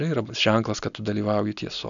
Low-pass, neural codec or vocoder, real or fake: 7.2 kHz; none; real